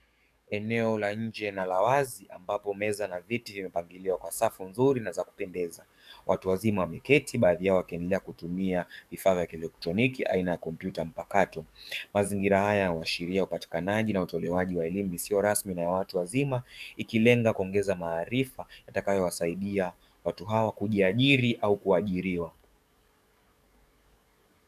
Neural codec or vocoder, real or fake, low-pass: codec, 44.1 kHz, 7.8 kbps, DAC; fake; 14.4 kHz